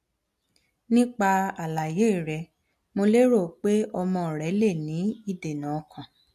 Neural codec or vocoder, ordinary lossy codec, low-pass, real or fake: none; MP3, 64 kbps; 14.4 kHz; real